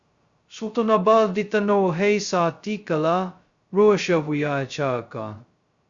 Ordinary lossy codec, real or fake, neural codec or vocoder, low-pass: Opus, 64 kbps; fake; codec, 16 kHz, 0.2 kbps, FocalCodec; 7.2 kHz